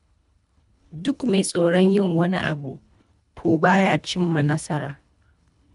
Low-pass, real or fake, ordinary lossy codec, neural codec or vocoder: 10.8 kHz; fake; none; codec, 24 kHz, 1.5 kbps, HILCodec